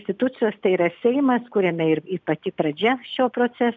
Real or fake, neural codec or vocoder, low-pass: real; none; 7.2 kHz